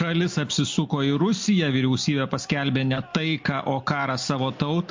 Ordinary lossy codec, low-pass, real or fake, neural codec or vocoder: MP3, 48 kbps; 7.2 kHz; real; none